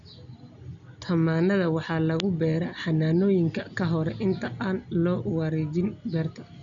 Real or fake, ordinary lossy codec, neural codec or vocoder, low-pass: real; none; none; 7.2 kHz